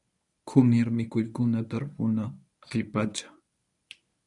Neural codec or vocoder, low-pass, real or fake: codec, 24 kHz, 0.9 kbps, WavTokenizer, medium speech release version 1; 10.8 kHz; fake